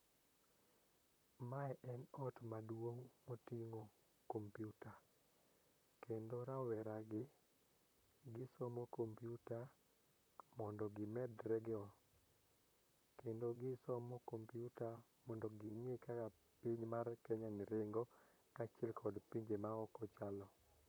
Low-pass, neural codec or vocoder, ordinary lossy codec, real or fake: none; vocoder, 44.1 kHz, 128 mel bands, Pupu-Vocoder; none; fake